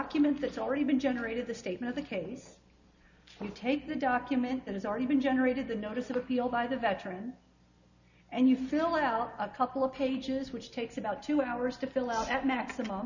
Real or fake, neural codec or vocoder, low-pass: real; none; 7.2 kHz